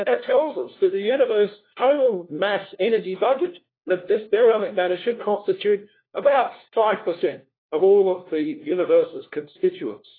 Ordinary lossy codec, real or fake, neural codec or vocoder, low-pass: AAC, 24 kbps; fake; codec, 16 kHz, 1 kbps, FunCodec, trained on LibriTTS, 50 frames a second; 5.4 kHz